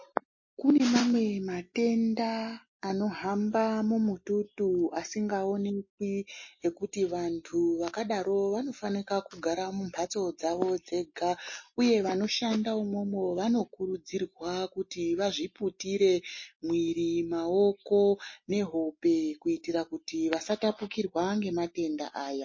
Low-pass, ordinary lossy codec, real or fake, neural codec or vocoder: 7.2 kHz; MP3, 32 kbps; real; none